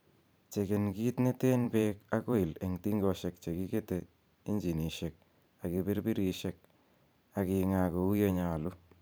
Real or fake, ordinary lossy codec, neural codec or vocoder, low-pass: fake; none; vocoder, 44.1 kHz, 128 mel bands every 512 samples, BigVGAN v2; none